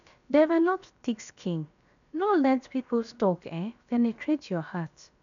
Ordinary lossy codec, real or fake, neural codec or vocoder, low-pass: none; fake; codec, 16 kHz, about 1 kbps, DyCAST, with the encoder's durations; 7.2 kHz